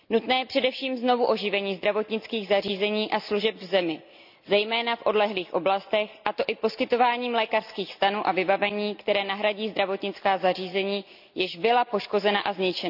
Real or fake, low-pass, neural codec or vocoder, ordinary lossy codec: real; 5.4 kHz; none; none